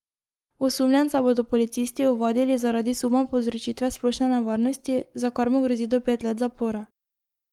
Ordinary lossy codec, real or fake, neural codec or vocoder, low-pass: Opus, 32 kbps; fake; codec, 44.1 kHz, 7.8 kbps, Pupu-Codec; 19.8 kHz